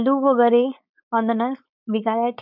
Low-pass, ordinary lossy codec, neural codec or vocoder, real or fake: 5.4 kHz; none; codec, 16 kHz, 4.8 kbps, FACodec; fake